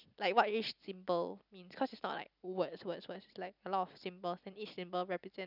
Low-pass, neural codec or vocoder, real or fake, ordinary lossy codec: 5.4 kHz; none; real; none